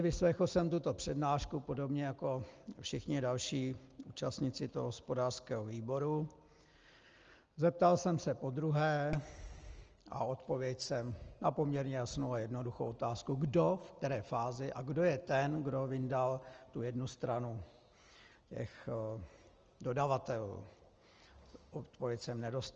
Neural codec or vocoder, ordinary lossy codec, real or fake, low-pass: none; Opus, 24 kbps; real; 7.2 kHz